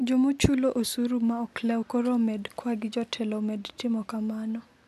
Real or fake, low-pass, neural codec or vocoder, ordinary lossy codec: real; 14.4 kHz; none; AAC, 96 kbps